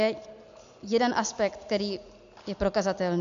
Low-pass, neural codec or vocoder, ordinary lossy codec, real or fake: 7.2 kHz; none; MP3, 64 kbps; real